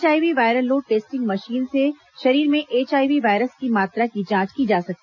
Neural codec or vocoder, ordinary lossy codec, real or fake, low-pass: none; none; real; 7.2 kHz